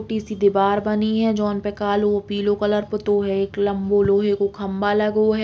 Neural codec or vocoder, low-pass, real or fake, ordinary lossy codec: none; none; real; none